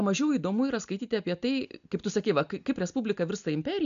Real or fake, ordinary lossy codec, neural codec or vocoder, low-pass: real; AAC, 96 kbps; none; 7.2 kHz